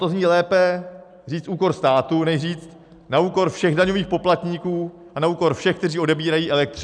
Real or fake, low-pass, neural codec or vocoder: real; 9.9 kHz; none